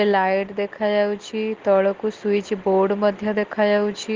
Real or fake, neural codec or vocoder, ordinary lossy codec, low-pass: real; none; Opus, 16 kbps; 7.2 kHz